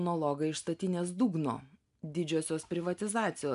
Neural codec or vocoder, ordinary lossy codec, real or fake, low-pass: none; AAC, 96 kbps; real; 10.8 kHz